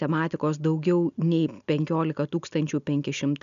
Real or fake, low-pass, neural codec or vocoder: real; 7.2 kHz; none